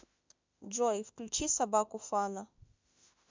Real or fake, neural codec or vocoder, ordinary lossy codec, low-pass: fake; autoencoder, 48 kHz, 32 numbers a frame, DAC-VAE, trained on Japanese speech; MP3, 64 kbps; 7.2 kHz